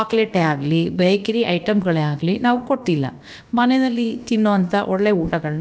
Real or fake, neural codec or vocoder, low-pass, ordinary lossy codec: fake; codec, 16 kHz, about 1 kbps, DyCAST, with the encoder's durations; none; none